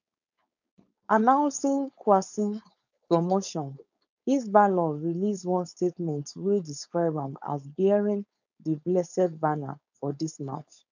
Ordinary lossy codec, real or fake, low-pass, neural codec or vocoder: none; fake; 7.2 kHz; codec, 16 kHz, 4.8 kbps, FACodec